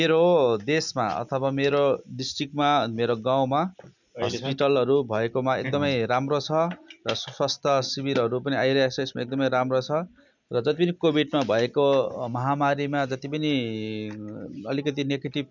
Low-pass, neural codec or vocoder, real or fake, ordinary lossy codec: 7.2 kHz; none; real; none